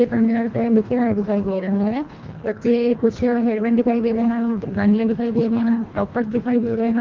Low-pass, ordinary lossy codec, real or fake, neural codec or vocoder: 7.2 kHz; Opus, 32 kbps; fake; codec, 24 kHz, 1.5 kbps, HILCodec